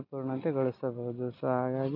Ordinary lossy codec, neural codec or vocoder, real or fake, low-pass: none; none; real; 5.4 kHz